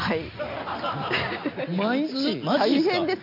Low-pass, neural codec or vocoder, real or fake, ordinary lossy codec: 5.4 kHz; none; real; AAC, 48 kbps